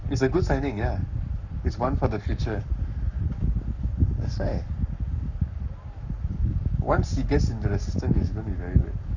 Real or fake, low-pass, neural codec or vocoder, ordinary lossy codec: fake; 7.2 kHz; codec, 44.1 kHz, 7.8 kbps, Pupu-Codec; none